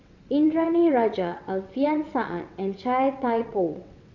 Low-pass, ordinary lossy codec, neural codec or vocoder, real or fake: 7.2 kHz; none; vocoder, 22.05 kHz, 80 mel bands, WaveNeXt; fake